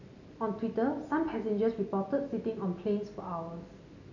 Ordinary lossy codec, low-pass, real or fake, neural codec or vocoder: none; 7.2 kHz; real; none